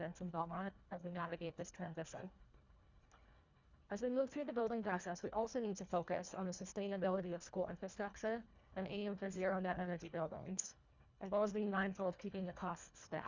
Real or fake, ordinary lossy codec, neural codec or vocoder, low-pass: fake; Opus, 64 kbps; codec, 24 kHz, 1.5 kbps, HILCodec; 7.2 kHz